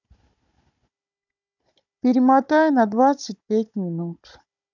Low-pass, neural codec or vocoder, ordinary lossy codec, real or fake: 7.2 kHz; codec, 16 kHz, 16 kbps, FunCodec, trained on Chinese and English, 50 frames a second; none; fake